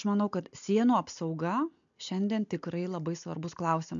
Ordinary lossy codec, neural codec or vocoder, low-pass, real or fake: MP3, 64 kbps; none; 7.2 kHz; real